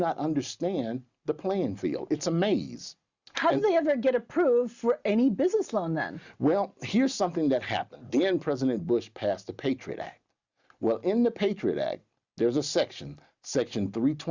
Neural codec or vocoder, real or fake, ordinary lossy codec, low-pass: none; real; Opus, 64 kbps; 7.2 kHz